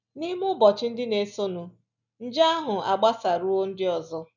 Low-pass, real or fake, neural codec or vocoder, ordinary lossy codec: 7.2 kHz; real; none; none